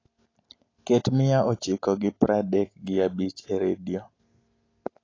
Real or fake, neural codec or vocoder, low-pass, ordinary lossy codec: real; none; 7.2 kHz; AAC, 32 kbps